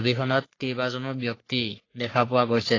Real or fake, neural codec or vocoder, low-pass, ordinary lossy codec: fake; codec, 44.1 kHz, 3.4 kbps, Pupu-Codec; 7.2 kHz; AAC, 32 kbps